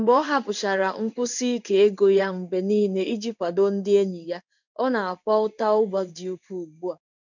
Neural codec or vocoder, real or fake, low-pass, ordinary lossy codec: codec, 16 kHz in and 24 kHz out, 1 kbps, XY-Tokenizer; fake; 7.2 kHz; none